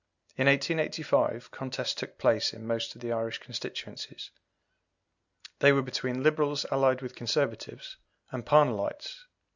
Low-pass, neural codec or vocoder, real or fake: 7.2 kHz; none; real